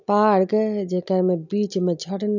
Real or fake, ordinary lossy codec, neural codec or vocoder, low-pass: real; none; none; 7.2 kHz